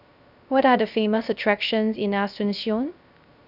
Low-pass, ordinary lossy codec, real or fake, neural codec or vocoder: 5.4 kHz; none; fake; codec, 16 kHz, 0.3 kbps, FocalCodec